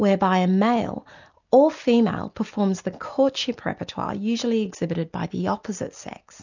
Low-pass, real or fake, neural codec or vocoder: 7.2 kHz; real; none